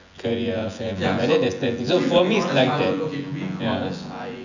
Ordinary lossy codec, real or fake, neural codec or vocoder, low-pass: none; fake; vocoder, 24 kHz, 100 mel bands, Vocos; 7.2 kHz